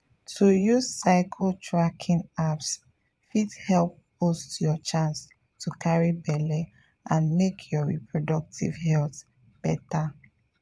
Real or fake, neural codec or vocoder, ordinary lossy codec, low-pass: fake; vocoder, 22.05 kHz, 80 mel bands, Vocos; none; none